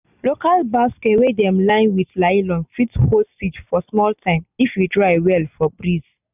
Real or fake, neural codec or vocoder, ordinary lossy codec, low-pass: real; none; none; 3.6 kHz